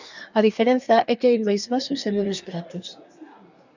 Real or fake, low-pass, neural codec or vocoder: fake; 7.2 kHz; codec, 24 kHz, 1 kbps, SNAC